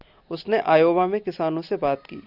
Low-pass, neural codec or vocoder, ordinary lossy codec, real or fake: 5.4 kHz; none; Opus, 64 kbps; real